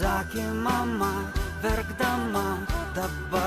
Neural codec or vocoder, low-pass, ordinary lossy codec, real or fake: none; 14.4 kHz; AAC, 64 kbps; real